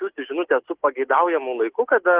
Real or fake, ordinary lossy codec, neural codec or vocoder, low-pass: real; Opus, 16 kbps; none; 3.6 kHz